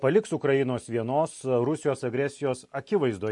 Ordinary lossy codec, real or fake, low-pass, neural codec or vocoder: MP3, 48 kbps; real; 10.8 kHz; none